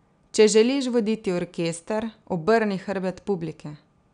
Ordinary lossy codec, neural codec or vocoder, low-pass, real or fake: none; none; 9.9 kHz; real